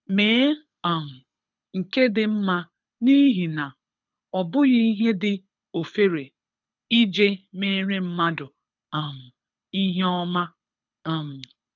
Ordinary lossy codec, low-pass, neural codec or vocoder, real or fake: none; 7.2 kHz; codec, 24 kHz, 6 kbps, HILCodec; fake